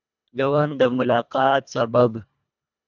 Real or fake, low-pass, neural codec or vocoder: fake; 7.2 kHz; codec, 24 kHz, 1.5 kbps, HILCodec